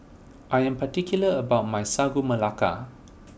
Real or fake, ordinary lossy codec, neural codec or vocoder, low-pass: real; none; none; none